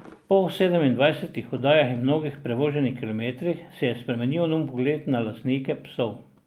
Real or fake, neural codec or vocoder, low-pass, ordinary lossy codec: real; none; 19.8 kHz; Opus, 32 kbps